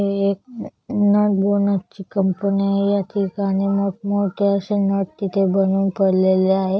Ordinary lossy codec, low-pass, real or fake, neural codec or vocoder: none; none; real; none